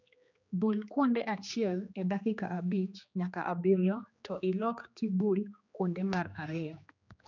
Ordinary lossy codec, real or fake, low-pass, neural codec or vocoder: none; fake; 7.2 kHz; codec, 16 kHz, 2 kbps, X-Codec, HuBERT features, trained on general audio